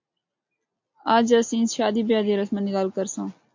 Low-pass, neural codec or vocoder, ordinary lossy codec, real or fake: 7.2 kHz; none; MP3, 48 kbps; real